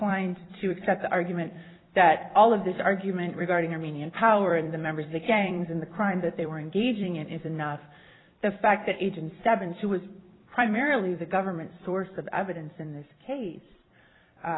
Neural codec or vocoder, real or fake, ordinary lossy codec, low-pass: vocoder, 44.1 kHz, 128 mel bands every 256 samples, BigVGAN v2; fake; AAC, 16 kbps; 7.2 kHz